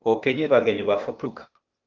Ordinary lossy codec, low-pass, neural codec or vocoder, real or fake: Opus, 32 kbps; 7.2 kHz; codec, 16 kHz, 0.8 kbps, ZipCodec; fake